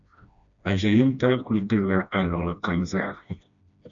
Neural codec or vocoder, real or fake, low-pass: codec, 16 kHz, 1 kbps, FreqCodec, smaller model; fake; 7.2 kHz